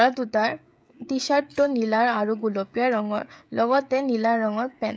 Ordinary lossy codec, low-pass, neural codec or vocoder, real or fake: none; none; codec, 16 kHz, 16 kbps, FreqCodec, smaller model; fake